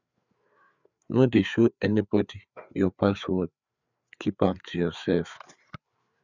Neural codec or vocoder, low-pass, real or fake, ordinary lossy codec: codec, 16 kHz, 4 kbps, FreqCodec, larger model; 7.2 kHz; fake; none